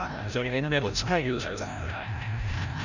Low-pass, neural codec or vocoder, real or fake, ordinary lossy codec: 7.2 kHz; codec, 16 kHz, 0.5 kbps, FreqCodec, larger model; fake; none